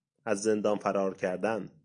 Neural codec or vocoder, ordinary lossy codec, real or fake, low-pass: none; AAC, 64 kbps; real; 9.9 kHz